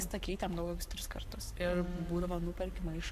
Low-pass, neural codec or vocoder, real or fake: 14.4 kHz; codec, 44.1 kHz, 7.8 kbps, Pupu-Codec; fake